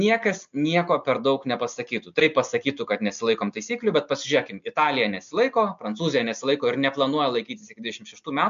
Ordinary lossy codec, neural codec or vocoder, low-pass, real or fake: MP3, 64 kbps; none; 7.2 kHz; real